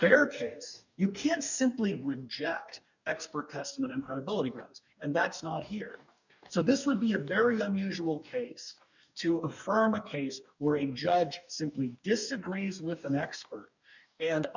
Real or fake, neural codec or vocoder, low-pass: fake; codec, 44.1 kHz, 2.6 kbps, DAC; 7.2 kHz